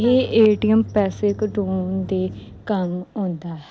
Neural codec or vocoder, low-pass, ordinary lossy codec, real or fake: none; none; none; real